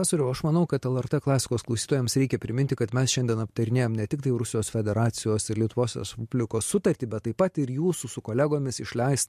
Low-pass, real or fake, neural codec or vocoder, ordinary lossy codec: 14.4 kHz; real; none; MP3, 64 kbps